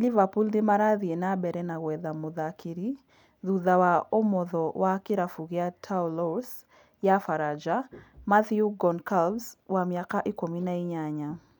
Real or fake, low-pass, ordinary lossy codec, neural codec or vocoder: real; 19.8 kHz; none; none